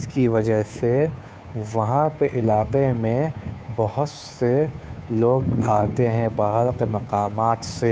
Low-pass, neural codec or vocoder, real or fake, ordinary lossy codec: none; codec, 16 kHz, 2 kbps, FunCodec, trained on Chinese and English, 25 frames a second; fake; none